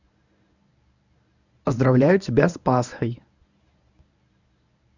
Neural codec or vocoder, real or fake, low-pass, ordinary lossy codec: codec, 24 kHz, 0.9 kbps, WavTokenizer, medium speech release version 1; fake; 7.2 kHz; none